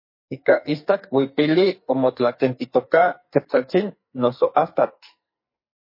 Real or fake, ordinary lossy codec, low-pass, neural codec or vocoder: fake; MP3, 24 kbps; 5.4 kHz; codec, 44.1 kHz, 2.6 kbps, SNAC